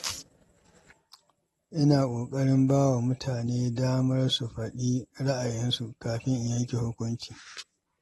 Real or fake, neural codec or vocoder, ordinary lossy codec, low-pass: real; none; AAC, 32 kbps; 19.8 kHz